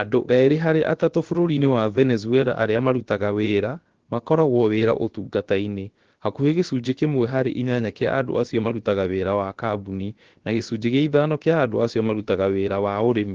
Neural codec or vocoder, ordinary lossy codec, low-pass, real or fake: codec, 16 kHz, about 1 kbps, DyCAST, with the encoder's durations; Opus, 16 kbps; 7.2 kHz; fake